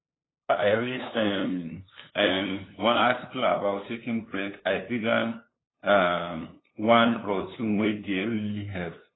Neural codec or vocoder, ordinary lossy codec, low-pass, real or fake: codec, 16 kHz, 2 kbps, FunCodec, trained on LibriTTS, 25 frames a second; AAC, 16 kbps; 7.2 kHz; fake